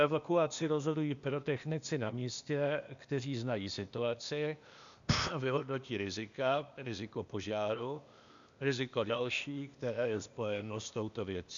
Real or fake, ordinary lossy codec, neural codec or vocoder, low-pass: fake; MP3, 96 kbps; codec, 16 kHz, 0.8 kbps, ZipCodec; 7.2 kHz